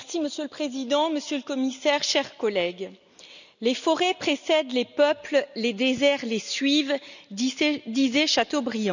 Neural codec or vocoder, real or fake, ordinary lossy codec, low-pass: none; real; none; 7.2 kHz